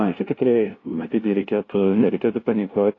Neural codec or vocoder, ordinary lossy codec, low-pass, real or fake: codec, 16 kHz, 0.5 kbps, FunCodec, trained on LibriTTS, 25 frames a second; AAC, 32 kbps; 7.2 kHz; fake